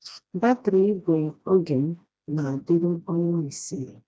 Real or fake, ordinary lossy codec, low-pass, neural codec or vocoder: fake; none; none; codec, 16 kHz, 1 kbps, FreqCodec, smaller model